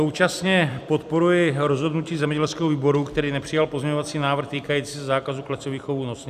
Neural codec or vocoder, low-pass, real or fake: vocoder, 44.1 kHz, 128 mel bands every 256 samples, BigVGAN v2; 14.4 kHz; fake